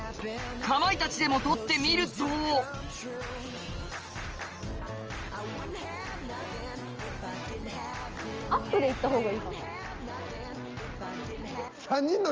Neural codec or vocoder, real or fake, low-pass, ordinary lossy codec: none; real; 7.2 kHz; Opus, 24 kbps